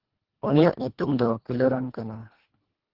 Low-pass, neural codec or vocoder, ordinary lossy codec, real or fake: 5.4 kHz; codec, 24 kHz, 1.5 kbps, HILCodec; Opus, 16 kbps; fake